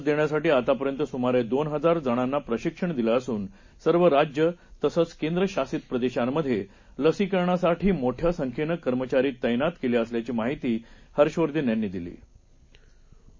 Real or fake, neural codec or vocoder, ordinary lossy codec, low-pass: real; none; MP3, 32 kbps; 7.2 kHz